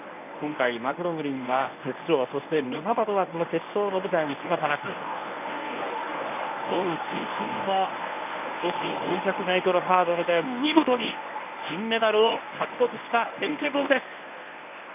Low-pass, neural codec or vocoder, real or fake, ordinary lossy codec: 3.6 kHz; codec, 24 kHz, 0.9 kbps, WavTokenizer, medium speech release version 1; fake; none